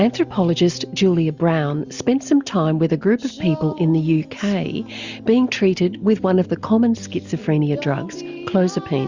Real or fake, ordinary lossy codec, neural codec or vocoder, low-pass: real; Opus, 64 kbps; none; 7.2 kHz